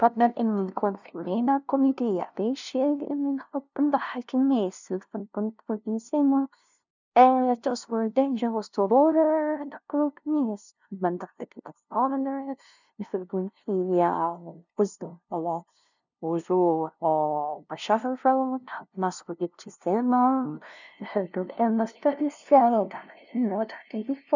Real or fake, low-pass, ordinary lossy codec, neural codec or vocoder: fake; 7.2 kHz; none; codec, 16 kHz, 0.5 kbps, FunCodec, trained on LibriTTS, 25 frames a second